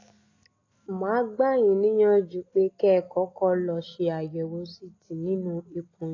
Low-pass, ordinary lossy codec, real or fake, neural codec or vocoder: 7.2 kHz; AAC, 32 kbps; real; none